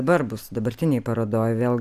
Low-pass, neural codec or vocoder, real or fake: 14.4 kHz; none; real